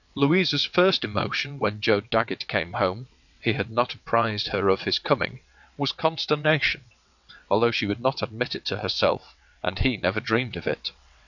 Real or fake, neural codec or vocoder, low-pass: fake; vocoder, 22.05 kHz, 80 mel bands, WaveNeXt; 7.2 kHz